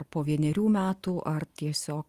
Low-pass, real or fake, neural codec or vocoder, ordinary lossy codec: 14.4 kHz; real; none; Opus, 32 kbps